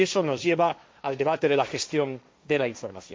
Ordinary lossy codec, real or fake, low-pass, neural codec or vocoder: none; fake; none; codec, 16 kHz, 1.1 kbps, Voila-Tokenizer